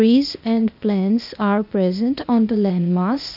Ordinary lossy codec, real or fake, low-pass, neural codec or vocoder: none; fake; 5.4 kHz; codec, 16 kHz, 0.8 kbps, ZipCodec